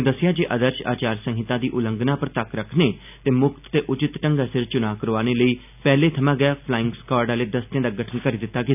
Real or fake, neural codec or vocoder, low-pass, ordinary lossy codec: real; none; 3.6 kHz; none